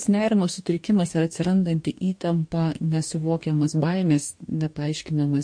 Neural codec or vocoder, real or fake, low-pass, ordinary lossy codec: codec, 44.1 kHz, 2.6 kbps, DAC; fake; 9.9 kHz; MP3, 48 kbps